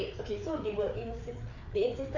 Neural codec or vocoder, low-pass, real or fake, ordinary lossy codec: codec, 16 kHz, 4 kbps, FreqCodec, larger model; 7.2 kHz; fake; none